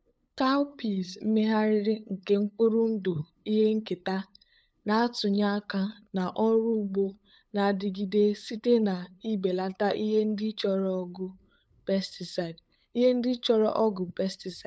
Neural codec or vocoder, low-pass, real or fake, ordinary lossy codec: codec, 16 kHz, 8 kbps, FunCodec, trained on LibriTTS, 25 frames a second; none; fake; none